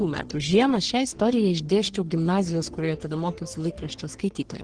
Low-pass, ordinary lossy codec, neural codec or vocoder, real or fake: 9.9 kHz; Opus, 16 kbps; codec, 44.1 kHz, 3.4 kbps, Pupu-Codec; fake